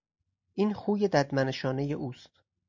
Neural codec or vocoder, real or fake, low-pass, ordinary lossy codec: none; real; 7.2 kHz; MP3, 64 kbps